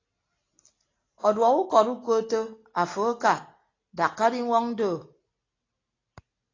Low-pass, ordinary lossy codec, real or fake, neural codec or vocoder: 7.2 kHz; AAC, 32 kbps; real; none